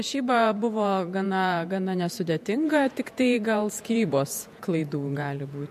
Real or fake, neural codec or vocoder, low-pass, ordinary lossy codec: fake; vocoder, 48 kHz, 128 mel bands, Vocos; 14.4 kHz; MP3, 64 kbps